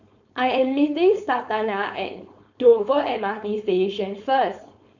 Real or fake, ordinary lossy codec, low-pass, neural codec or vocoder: fake; none; 7.2 kHz; codec, 16 kHz, 4.8 kbps, FACodec